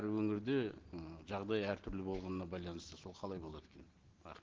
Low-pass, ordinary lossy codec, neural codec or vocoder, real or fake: 7.2 kHz; Opus, 16 kbps; none; real